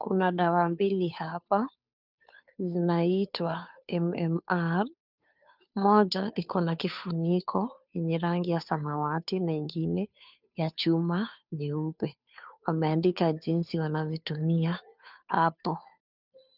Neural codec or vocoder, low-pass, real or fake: codec, 16 kHz, 2 kbps, FunCodec, trained on Chinese and English, 25 frames a second; 5.4 kHz; fake